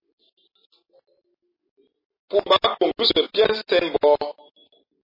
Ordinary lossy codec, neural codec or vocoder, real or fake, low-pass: MP3, 24 kbps; none; real; 5.4 kHz